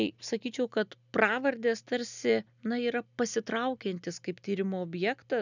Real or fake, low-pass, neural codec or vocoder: real; 7.2 kHz; none